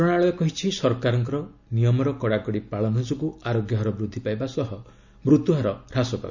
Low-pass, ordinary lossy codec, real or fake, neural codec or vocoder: 7.2 kHz; none; real; none